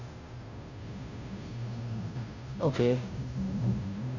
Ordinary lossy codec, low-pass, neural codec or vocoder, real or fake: none; 7.2 kHz; codec, 16 kHz, 0.5 kbps, FunCodec, trained on Chinese and English, 25 frames a second; fake